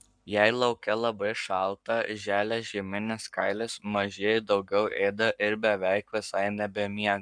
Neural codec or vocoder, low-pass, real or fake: codec, 44.1 kHz, 7.8 kbps, Pupu-Codec; 9.9 kHz; fake